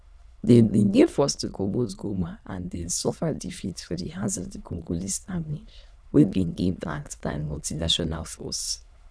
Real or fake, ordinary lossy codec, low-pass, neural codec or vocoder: fake; none; none; autoencoder, 22.05 kHz, a latent of 192 numbers a frame, VITS, trained on many speakers